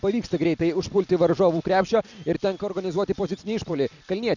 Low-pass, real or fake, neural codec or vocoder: 7.2 kHz; real; none